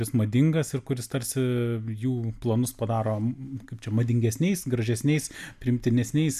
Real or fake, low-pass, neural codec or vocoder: real; 14.4 kHz; none